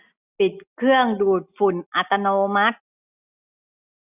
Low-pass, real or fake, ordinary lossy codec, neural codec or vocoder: 3.6 kHz; real; none; none